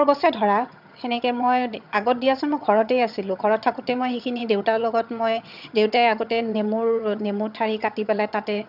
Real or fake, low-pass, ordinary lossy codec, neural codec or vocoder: fake; 5.4 kHz; none; vocoder, 22.05 kHz, 80 mel bands, HiFi-GAN